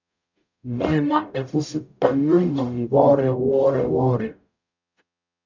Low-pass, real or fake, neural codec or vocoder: 7.2 kHz; fake; codec, 44.1 kHz, 0.9 kbps, DAC